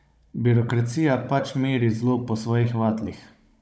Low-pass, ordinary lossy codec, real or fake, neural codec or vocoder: none; none; fake; codec, 16 kHz, 16 kbps, FunCodec, trained on Chinese and English, 50 frames a second